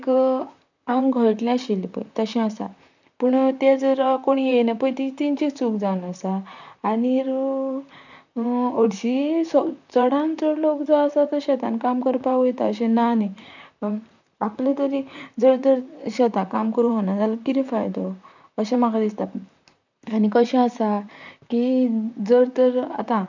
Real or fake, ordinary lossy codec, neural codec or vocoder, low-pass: fake; none; vocoder, 44.1 kHz, 128 mel bands, Pupu-Vocoder; 7.2 kHz